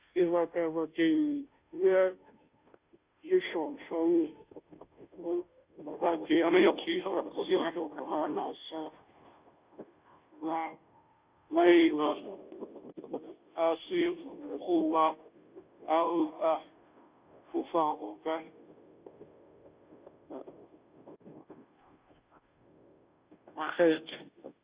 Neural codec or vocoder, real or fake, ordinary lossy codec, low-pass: codec, 16 kHz, 0.5 kbps, FunCodec, trained on Chinese and English, 25 frames a second; fake; Opus, 64 kbps; 3.6 kHz